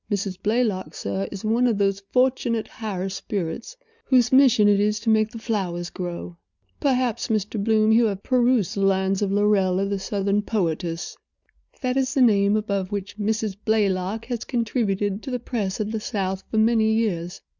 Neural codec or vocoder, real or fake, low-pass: none; real; 7.2 kHz